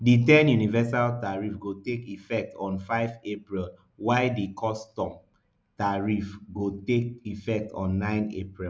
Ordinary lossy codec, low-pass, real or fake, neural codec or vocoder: none; none; real; none